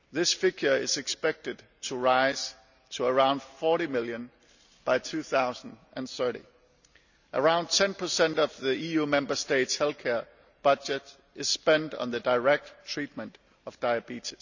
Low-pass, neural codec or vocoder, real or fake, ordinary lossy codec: 7.2 kHz; none; real; none